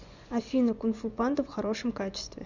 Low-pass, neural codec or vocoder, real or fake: 7.2 kHz; vocoder, 24 kHz, 100 mel bands, Vocos; fake